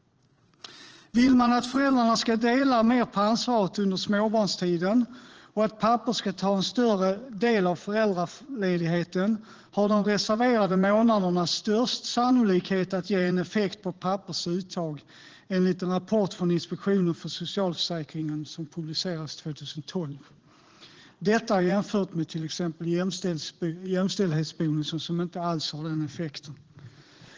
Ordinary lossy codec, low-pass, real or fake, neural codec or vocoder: Opus, 16 kbps; 7.2 kHz; fake; vocoder, 44.1 kHz, 80 mel bands, Vocos